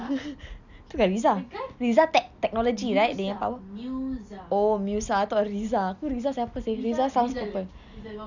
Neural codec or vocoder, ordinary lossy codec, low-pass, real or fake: none; none; 7.2 kHz; real